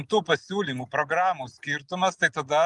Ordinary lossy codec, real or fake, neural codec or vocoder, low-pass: Opus, 32 kbps; real; none; 10.8 kHz